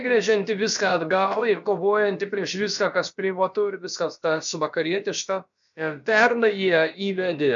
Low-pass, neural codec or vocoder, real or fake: 7.2 kHz; codec, 16 kHz, about 1 kbps, DyCAST, with the encoder's durations; fake